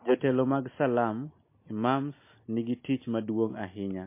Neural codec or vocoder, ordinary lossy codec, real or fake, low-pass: none; MP3, 24 kbps; real; 3.6 kHz